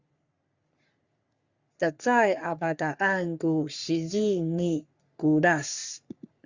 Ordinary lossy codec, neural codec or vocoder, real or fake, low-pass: Opus, 64 kbps; codec, 44.1 kHz, 3.4 kbps, Pupu-Codec; fake; 7.2 kHz